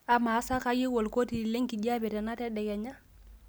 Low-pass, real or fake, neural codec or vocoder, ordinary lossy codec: none; real; none; none